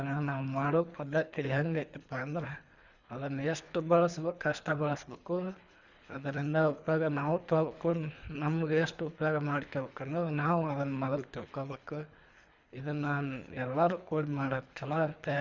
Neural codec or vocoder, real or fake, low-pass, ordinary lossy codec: codec, 24 kHz, 3 kbps, HILCodec; fake; 7.2 kHz; Opus, 64 kbps